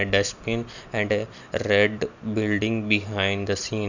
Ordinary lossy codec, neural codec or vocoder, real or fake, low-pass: none; none; real; 7.2 kHz